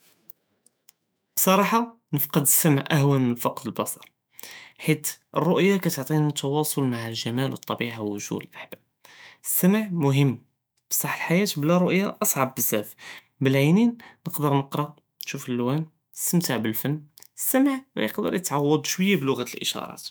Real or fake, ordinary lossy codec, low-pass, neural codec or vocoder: fake; none; none; autoencoder, 48 kHz, 128 numbers a frame, DAC-VAE, trained on Japanese speech